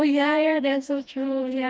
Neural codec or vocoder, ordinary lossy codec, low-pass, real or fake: codec, 16 kHz, 1 kbps, FreqCodec, smaller model; none; none; fake